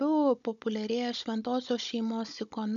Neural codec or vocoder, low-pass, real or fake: codec, 16 kHz, 16 kbps, FunCodec, trained on Chinese and English, 50 frames a second; 7.2 kHz; fake